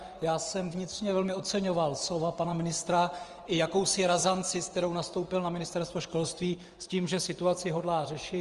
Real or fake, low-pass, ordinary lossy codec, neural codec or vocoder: fake; 10.8 kHz; Opus, 24 kbps; vocoder, 24 kHz, 100 mel bands, Vocos